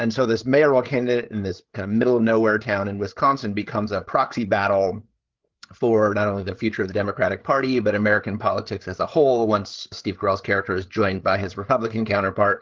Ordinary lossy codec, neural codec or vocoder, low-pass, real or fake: Opus, 16 kbps; codec, 16 kHz, 8 kbps, FreqCodec, larger model; 7.2 kHz; fake